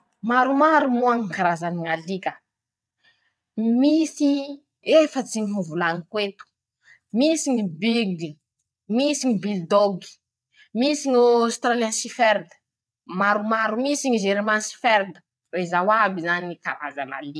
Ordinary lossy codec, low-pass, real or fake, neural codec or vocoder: none; none; fake; vocoder, 22.05 kHz, 80 mel bands, WaveNeXt